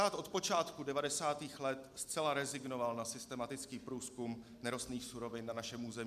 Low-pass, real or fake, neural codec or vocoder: 14.4 kHz; real; none